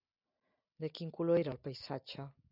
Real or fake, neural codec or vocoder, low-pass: real; none; 5.4 kHz